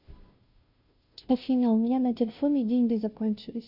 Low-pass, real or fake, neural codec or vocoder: 5.4 kHz; fake; codec, 16 kHz, 0.5 kbps, FunCodec, trained on Chinese and English, 25 frames a second